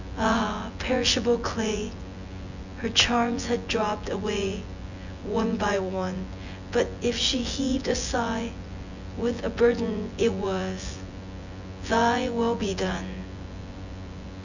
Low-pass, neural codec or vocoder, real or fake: 7.2 kHz; vocoder, 24 kHz, 100 mel bands, Vocos; fake